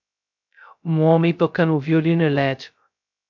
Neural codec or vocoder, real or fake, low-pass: codec, 16 kHz, 0.2 kbps, FocalCodec; fake; 7.2 kHz